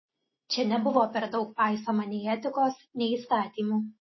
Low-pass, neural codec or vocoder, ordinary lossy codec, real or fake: 7.2 kHz; none; MP3, 24 kbps; real